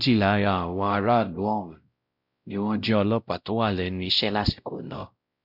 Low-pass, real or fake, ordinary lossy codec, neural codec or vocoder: 5.4 kHz; fake; none; codec, 16 kHz, 0.5 kbps, X-Codec, WavLM features, trained on Multilingual LibriSpeech